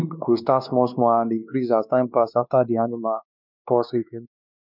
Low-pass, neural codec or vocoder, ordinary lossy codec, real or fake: 5.4 kHz; codec, 16 kHz, 2 kbps, X-Codec, WavLM features, trained on Multilingual LibriSpeech; none; fake